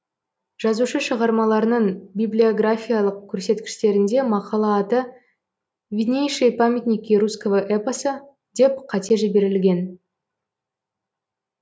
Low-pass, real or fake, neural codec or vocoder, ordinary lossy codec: none; real; none; none